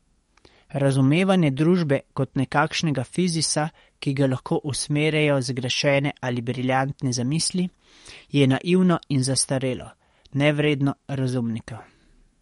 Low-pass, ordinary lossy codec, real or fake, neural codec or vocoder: 19.8 kHz; MP3, 48 kbps; fake; codec, 44.1 kHz, 7.8 kbps, DAC